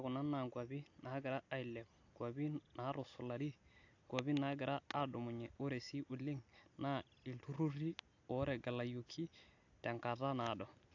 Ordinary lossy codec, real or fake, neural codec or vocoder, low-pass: AAC, 48 kbps; real; none; 7.2 kHz